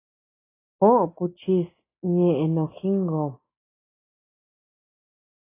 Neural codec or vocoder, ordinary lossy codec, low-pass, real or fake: none; AAC, 16 kbps; 3.6 kHz; real